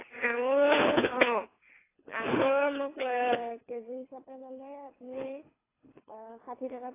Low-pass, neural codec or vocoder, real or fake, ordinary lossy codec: 3.6 kHz; codec, 24 kHz, 3 kbps, HILCodec; fake; AAC, 16 kbps